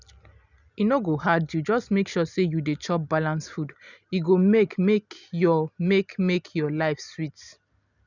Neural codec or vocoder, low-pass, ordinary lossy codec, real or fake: none; 7.2 kHz; none; real